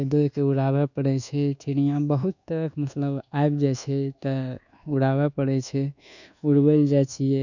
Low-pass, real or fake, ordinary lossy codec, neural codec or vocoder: 7.2 kHz; fake; none; codec, 24 kHz, 1.2 kbps, DualCodec